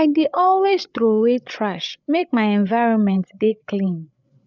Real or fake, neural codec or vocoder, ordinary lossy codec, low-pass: fake; codec, 16 kHz, 8 kbps, FreqCodec, larger model; none; 7.2 kHz